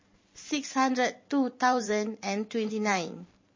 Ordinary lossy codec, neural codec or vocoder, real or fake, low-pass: MP3, 32 kbps; vocoder, 44.1 kHz, 128 mel bands every 512 samples, BigVGAN v2; fake; 7.2 kHz